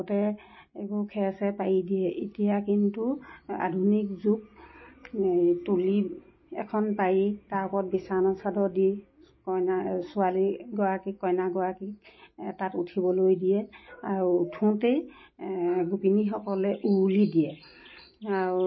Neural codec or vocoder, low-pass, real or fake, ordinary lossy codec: none; 7.2 kHz; real; MP3, 24 kbps